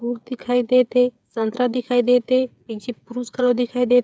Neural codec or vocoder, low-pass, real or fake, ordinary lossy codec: codec, 16 kHz, 8 kbps, FreqCodec, smaller model; none; fake; none